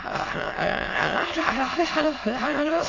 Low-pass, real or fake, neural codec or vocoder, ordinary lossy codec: 7.2 kHz; fake; autoencoder, 22.05 kHz, a latent of 192 numbers a frame, VITS, trained on many speakers; AAC, 32 kbps